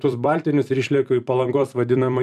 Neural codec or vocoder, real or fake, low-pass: vocoder, 44.1 kHz, 128 mel bands every 256 samples, BigVGAN v2; fake; 14.4 kHz